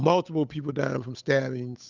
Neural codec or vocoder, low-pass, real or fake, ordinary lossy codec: codec, 16 kHz, 8 kbps, FunCodec, trained on Chinese and English, 25 frames a second; 7.2 kHz; fake; Opus, 64 kbps